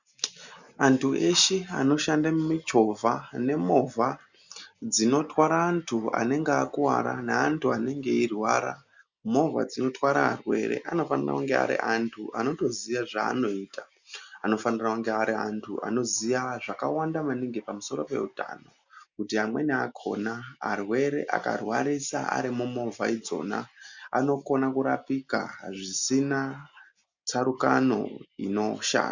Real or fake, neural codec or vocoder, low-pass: real; none; 7.2 kHz